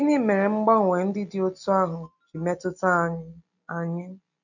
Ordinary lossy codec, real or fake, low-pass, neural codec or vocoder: none; real; 7.2 kHz; none